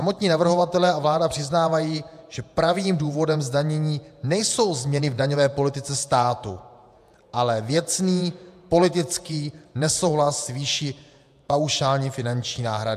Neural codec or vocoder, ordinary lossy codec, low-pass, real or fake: vocoder, 48 kHz, 128 mel bands, Vocos; AAC, 96 kbps; 14.4 kHz; fake